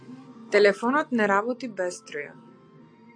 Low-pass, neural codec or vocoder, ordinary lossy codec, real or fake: 9.9 kHz; none; AAC, 48 kbps; real